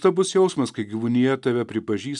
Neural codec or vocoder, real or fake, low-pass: none; real; 10.8 kHz